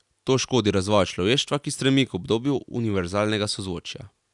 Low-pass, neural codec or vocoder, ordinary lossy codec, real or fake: 10.8 kHz; none; none; real